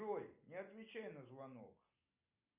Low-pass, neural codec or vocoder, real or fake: 3.6 kHz; none; real